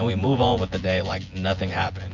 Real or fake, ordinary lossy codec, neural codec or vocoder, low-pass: fake; MP3, 48 kbps; vocoder, 24 kHz, 100 mel bands, Vocos; 7.2 kHz